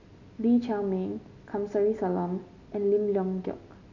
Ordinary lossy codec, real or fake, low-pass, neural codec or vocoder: none; real; 7.2 kHz; none